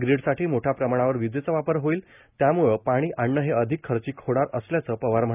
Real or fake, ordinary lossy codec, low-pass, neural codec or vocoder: real; none; 3.6 kHz; none